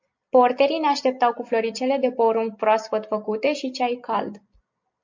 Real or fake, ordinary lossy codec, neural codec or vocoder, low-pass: real; MP3, 48 kbps; none; 7.2 kHz